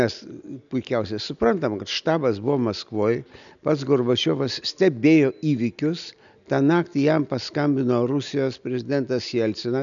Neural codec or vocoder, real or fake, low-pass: none; real; 7.2 kHz